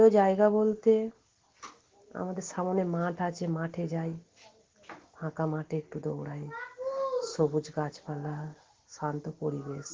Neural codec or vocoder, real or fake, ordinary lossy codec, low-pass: none; real; Opus, 16 kbps; 7.2 kHz